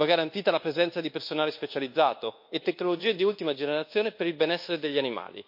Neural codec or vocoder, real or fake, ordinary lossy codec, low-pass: codec, 24 kHz, 1.2 kbps, DualCodec; fake; MP3, 32 kbps; 5.4 kHz